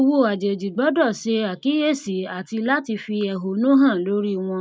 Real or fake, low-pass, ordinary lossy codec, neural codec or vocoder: real; none; none; none